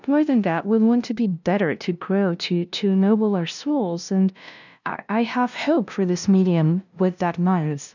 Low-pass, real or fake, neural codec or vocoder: 7.2 kHz; fake; codec, 16 kHz, 0.5 kbps, FunCodec, trained on LibriTTS, 25 frames a second